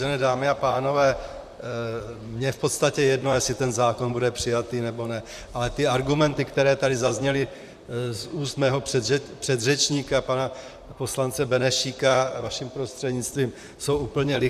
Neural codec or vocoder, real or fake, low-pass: vocoder, 44.1 kHz, 128 mel bands, Pupu-Vocoder; fake; 14.4 kHz